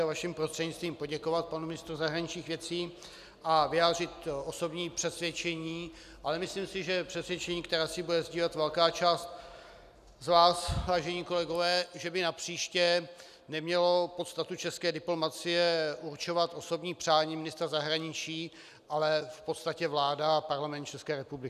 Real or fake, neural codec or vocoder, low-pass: real; none; 14.4 kHz